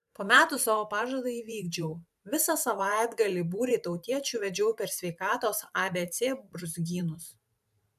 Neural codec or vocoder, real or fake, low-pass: vocoder, 44.1 kHz, 128 mel bands every 512 samples, BigVGAN v2; fake; 14.4 kHz